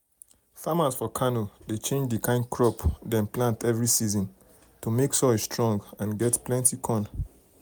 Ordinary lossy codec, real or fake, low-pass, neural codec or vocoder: none; real; none; none